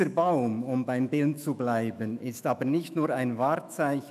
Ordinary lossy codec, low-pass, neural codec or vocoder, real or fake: none; 14.4 kHz; autoencoder, 48 kHz, 128 numbers a frame, DAC-VAE, trained on Japanese speech; fake